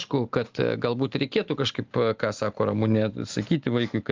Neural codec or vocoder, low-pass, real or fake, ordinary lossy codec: none; 7.2 kHz; real; Opus, 32 kbps